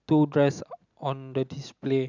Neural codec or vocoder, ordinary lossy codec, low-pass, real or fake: none; none; 7.2 kHz; real